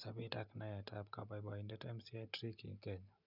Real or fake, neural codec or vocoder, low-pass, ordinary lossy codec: real; none; 5.4 kHz; none